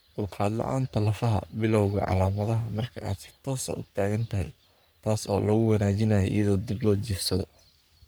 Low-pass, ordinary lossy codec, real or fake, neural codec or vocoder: none; none; fake; codec, 44.1 kHz, 3.4 kbps, Pupu-Codec